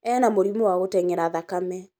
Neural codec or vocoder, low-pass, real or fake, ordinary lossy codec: none; none; real; none